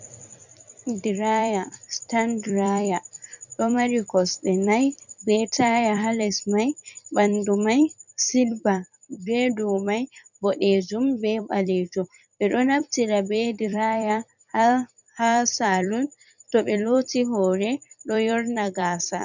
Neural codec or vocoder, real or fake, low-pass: vocoder, 44.1 kHz, 128 mel bands every 256 samples, BigVGAN v2; fake; 7.2 kHz